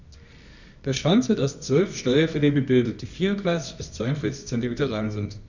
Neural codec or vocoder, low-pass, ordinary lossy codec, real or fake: codec, 24 kHz, 0.9 kbps, WavTokenizer, medium music audio release; 7.2 kHz; none; fake